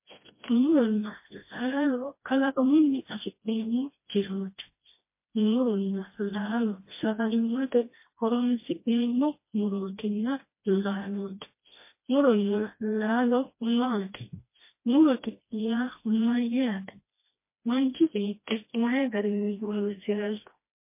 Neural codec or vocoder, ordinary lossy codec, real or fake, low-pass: codec, 16 kHz, 1 kbps, FreqCodec, smaller model; MP3, 24 kbps; fake; 3.6 kHz